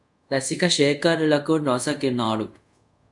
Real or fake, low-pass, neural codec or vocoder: fake; 10.8 kHz; codec, 24 kHz, 0.5 kbps, DualCodec